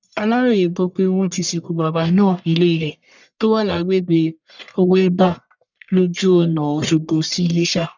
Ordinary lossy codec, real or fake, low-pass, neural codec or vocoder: none; fake; 7.2 kHz; codec, 44.1 kHz, 1.7 kbps, Pupu-Codec